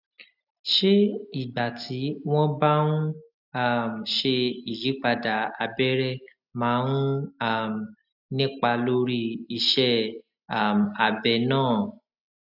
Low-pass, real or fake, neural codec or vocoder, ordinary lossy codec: 5.4 kHz; real; none; none